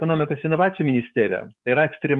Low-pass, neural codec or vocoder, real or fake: 10.8 kHz; codec, 44.1 kHz, 7.8 kbps, DAC; fake